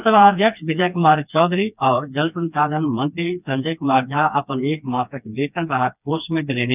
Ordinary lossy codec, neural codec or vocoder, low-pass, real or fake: none; codec, 16 kHz, 2 kbps, FreqCodec, smaller model; 3.6 kHz; fake